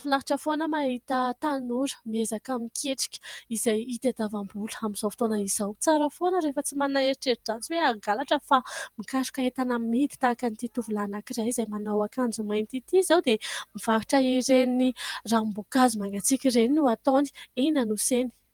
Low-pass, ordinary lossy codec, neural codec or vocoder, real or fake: 19.8 kHz; Opus, 32 kbps; vocoder, 48 kHz, 128 mel bands, Vocos; fake